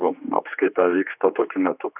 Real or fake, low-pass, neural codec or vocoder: fake; 3.6 kHz; codec, 16 kHz, 2 kbps, X-Codec, HuBERT features, trained on general audio